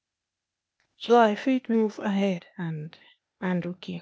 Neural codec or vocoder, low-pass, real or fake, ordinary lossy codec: codec, 16 kHz, 0.8 kbps, ZipCodec; none; fake; none